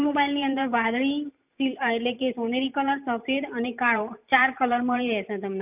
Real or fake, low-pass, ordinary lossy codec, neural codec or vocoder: fake; 3.6 kHz; none; vocoder, 44.1 kHz, 128 mel bands every 512 samples, BigVGAN v2